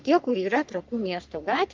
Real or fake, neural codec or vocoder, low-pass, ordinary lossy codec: fake; codec, 44.1 kHz, 3.4 kbps, Pupu-Codec; 7.2 kHz; Opus, 24 kbps